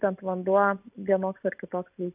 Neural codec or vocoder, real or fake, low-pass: none; real; 3.6 kHz